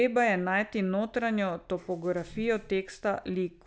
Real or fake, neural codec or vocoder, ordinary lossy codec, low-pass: real; none; none; none